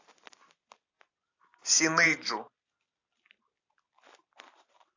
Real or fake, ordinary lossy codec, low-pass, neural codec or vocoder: real; AAC, 48 kbps; 7.2 kHz; none